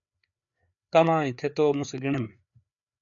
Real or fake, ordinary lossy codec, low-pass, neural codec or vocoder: fake; MP3, 96 kbps; 7.2 kHz; codec, 16 kHz, 4 kbps, FreqCodec, larger model